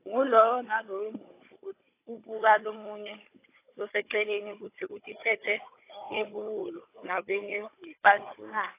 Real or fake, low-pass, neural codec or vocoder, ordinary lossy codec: fake; 3.6 kHz; codec, 16 kHz, 16 kbps, FunCodec, trained on Chinese and English, 50 frames a second; AAC, 24 kbps